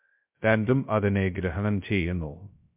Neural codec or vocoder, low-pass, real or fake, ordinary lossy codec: codec, 16 kHz, 0.2 kbps, FocalCodec; 3.6 kHz; fake; MP3, 32 kbps